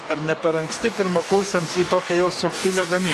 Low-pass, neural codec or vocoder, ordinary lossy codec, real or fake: 14.4 kHz; codec, 44.1 kHz, 2.6 kbps, DAC; AAC, 64 kbps; fake